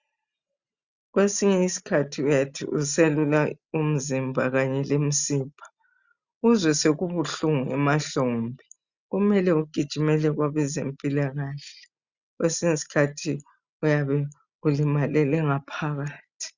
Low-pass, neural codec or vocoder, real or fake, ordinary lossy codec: 7.2 kHz; none; real; Opus, 64 kbps